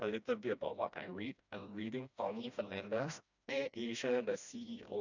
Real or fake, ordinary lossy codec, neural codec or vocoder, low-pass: fake; none; codec, 16 kHz, 1 kbps, FreqCodec, smaller model; 7.2 kHz